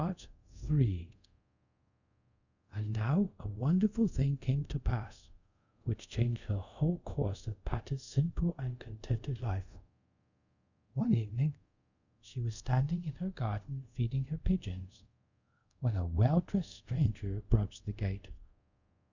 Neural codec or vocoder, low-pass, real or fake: codec, 24 kHz, 0.5 kbps, DualCodec; 7.2 kHz; fake